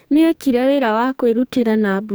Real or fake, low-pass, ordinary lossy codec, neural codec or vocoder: fake; none; none; codec, 44.1 kHz, 2.6 kbps, SNAC